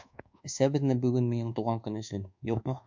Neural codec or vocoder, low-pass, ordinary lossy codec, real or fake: codec, 24 kHz, 1.2 kbps, DualCodec; 7.2 kHz; MP3, 64 kbps; fake